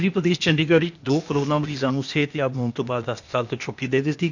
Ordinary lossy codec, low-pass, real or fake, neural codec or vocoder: none; 7.2 kHz; fake; codec, 16 kHz, 0.8 kbps, ZipCodec